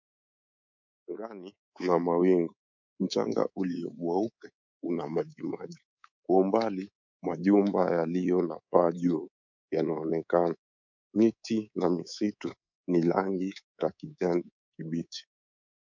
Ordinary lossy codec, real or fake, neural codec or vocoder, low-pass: MP3, 64 kbps; fake; codec, 24 kHz, 3.1 kbps, DualCodec; 7.2 kHz